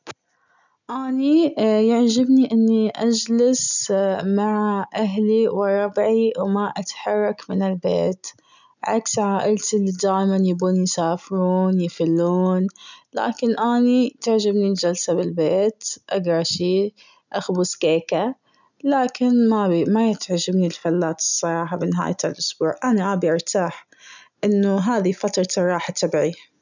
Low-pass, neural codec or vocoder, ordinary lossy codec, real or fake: 7.2 kHz; none; none; real